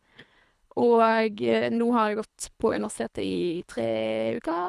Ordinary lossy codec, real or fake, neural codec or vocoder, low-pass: none; fake; codec, 24 kHz, 3 kbps, HILCodec; none